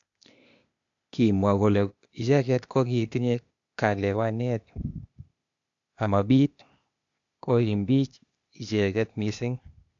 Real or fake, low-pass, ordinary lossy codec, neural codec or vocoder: fake; 7.2 kHz; AAC, 64 kbps; codec, 16 kHz, 0.8 kbps, ZipCodec